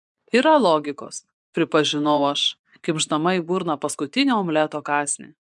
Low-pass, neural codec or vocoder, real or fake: 10.8 kHz; vocoder, 24 kHz, 100 mel bands, Vocos; fake